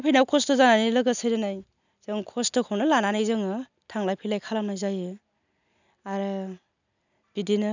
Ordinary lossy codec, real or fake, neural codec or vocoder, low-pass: none; real; none; 7.2 kHz